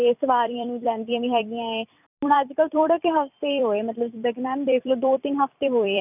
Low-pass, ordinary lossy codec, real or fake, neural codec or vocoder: 3.6 kHz; none; fake; vocoder, 44.1 kHz, 128 mel bands every 256 samples, BigVGAN v2